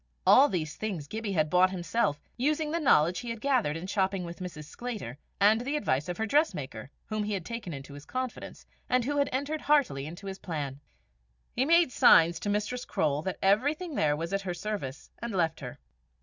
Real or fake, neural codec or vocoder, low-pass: real; none; 7.2 kHz